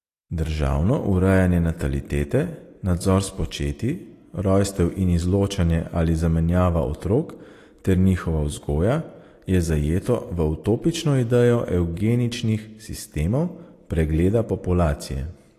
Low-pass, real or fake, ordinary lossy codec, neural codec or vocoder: 14.4 kHz; real; AAC, 48 kbps; none